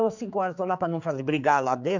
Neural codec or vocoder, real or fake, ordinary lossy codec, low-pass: codec, 16 kHz, 4 kbps, X-Codec, HuBERT features, trained on general audio; fake; none; 7.2 kHz